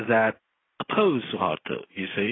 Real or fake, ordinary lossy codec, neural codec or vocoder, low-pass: real; AAC, 16 kbps; none; 7.2 kHz